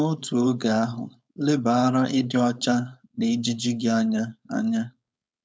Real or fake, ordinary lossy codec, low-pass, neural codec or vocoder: fake; none; none; codec, 16 kHz, 4.8 kbps, FACodec